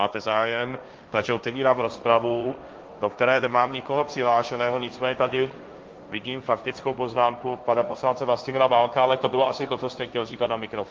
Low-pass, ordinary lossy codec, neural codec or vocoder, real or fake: 7.2 kHz; Opus, 24 kbps; codec, 16 kHz, 1.1 kbps, Voila-Tokenizer; fake